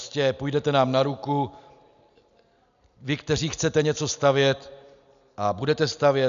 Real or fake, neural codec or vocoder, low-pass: real; none; 7.2 kHz